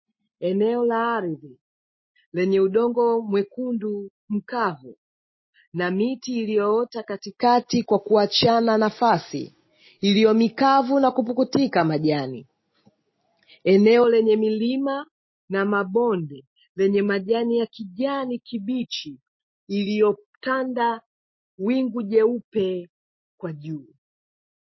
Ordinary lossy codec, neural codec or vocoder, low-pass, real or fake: MP3, 24 kbps; none; 7.2 kHz; real